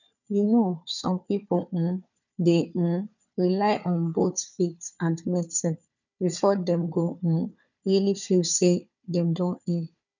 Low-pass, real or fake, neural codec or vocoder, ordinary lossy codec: 7.2 kHz; fake; codec, 16 kHz, 4 kbps, FunCodec, trained on Chinese and English, 50 frames a second; none